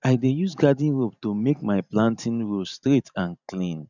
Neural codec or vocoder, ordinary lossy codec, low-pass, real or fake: vocoder, 44.1 kHz, 128 mel bands every 512 samples, BigVGAN v2; none; 7.2 kHz; fake